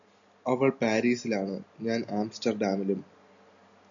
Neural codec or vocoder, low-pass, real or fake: none; 7.2 kHz; real